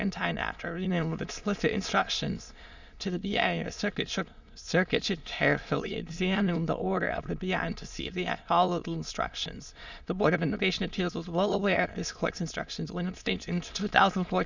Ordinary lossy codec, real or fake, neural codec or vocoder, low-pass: Opus, 64 kbps; fake; autoencoder, 22.05 kHz, a latent of 192 numbers a frame, VITS, trained on many speakers; 7.2 kHz